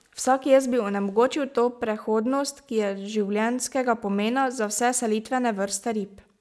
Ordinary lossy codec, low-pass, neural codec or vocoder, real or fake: none; none; none; real